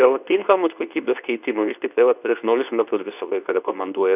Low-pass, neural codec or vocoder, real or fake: 3.6 kHz; codec, 24 kHz, 0.9 kbps, WavTokenizer, medium speech release version 2; fake